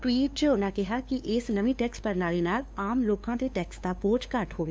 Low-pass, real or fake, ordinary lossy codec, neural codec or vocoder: none; fake; none; codec, 16 kHz, 2 kbps, FunCodec, trained on LibriTTS, 25 frames a second